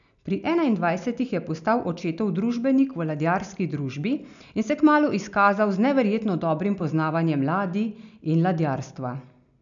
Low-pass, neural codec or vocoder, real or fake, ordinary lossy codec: 7.2 kHz; none; real; none